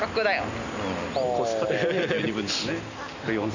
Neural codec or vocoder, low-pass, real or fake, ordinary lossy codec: none; 7.2 kHz; real; none